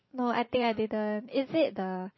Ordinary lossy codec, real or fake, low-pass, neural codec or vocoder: MP3, 24 kbps; fake; 7.2 kHz; vocoder, 44.1 kHz, 128 mel bands every 512 samples, BigVGAN v2